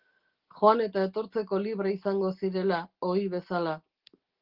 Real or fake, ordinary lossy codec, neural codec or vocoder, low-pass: real; Opus, 16 kbps; none; 5.4 kHz